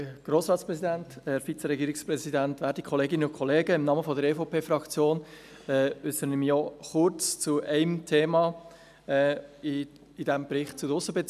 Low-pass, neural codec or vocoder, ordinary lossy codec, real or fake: 14.4 kHz; none; none; real